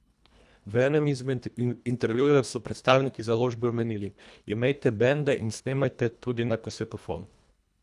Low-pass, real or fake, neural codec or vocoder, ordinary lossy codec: none; fake; codec, 24 kHz, 1.5 kbps, HILCodec; none